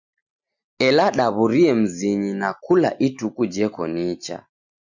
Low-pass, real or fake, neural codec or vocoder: 7.2 kHz; real; none